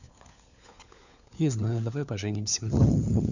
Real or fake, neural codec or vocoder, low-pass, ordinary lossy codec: fake; codec, 16 kHz, 4 kbps, FunCodec, trained on LibriTTS, 50 frames a second; 7.2 kHz; none